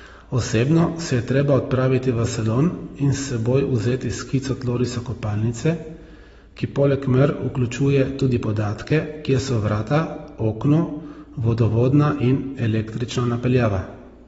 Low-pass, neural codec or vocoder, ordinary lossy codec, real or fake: 14.4 kHz; none; AAC, 24 kbps; real